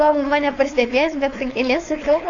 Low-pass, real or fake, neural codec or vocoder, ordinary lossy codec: 7.2 kHz; fake; codec, 16 kHz, 4.8 kbps, FACodec; AAC, 48 kbps